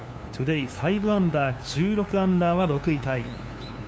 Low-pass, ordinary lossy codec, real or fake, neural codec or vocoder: none; none; fake; codec, 16 kHz, 2 kbps, FunCodec, trained on LibriTTS, 25 frames a second